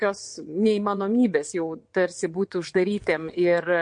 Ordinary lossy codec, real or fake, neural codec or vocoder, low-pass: MP3, 48 kbps; fake; vocoder, 22.05 kHz, 80 mel bands, WaveNeXt; 9.9 kHz